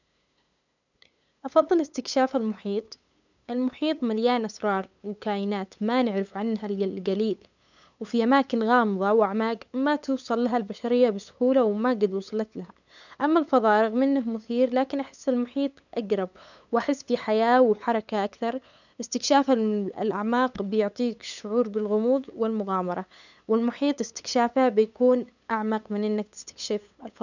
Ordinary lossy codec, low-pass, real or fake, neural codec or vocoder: none; 7.2 kHz; fake; codec, 16 kHz, 8 kbps, FunCodec, trained on LibriTTS, 25 frames a second